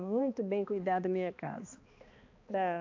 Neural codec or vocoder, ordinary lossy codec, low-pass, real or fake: codec, 16 kHz, 2 kbps, X-Codec, HuBERT features, trained on balanced general audio; AAC, 48 kbps; 7.2 kHz; fake